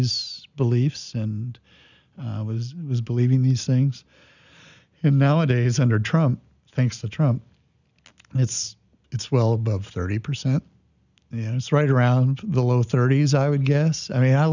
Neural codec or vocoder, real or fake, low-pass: none; real; 7.2 kHz